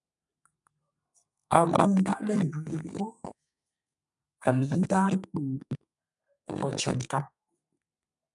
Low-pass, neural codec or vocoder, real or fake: 10.8 kHz; codec, 32 kHz, 1.9 kbps, SNAC; fake